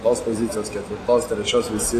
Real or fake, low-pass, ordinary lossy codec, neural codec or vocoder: real; 14.4 kHz; AAC, 48 kbps; none